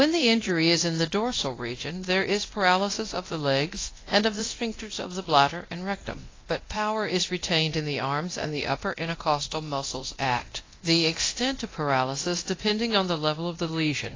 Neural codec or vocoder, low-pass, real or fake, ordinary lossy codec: codec, 24 kHz, 0.9 kbps, DualCodec; 7.2 kHz; fake; AAC, 32 kbps